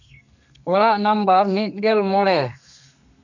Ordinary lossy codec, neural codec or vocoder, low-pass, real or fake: none; codec, 32 kHz, 1.9 kbps, SNAC; 7.2 kHz; fake